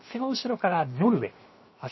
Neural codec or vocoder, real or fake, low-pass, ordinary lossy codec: codec, 16 kHz, 0.7 kbps, FocalCodec; fake; 7.2 kHz; MP3, 24 kbps